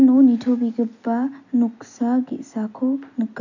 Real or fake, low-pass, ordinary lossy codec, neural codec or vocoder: real; 7.2 kHz; none; none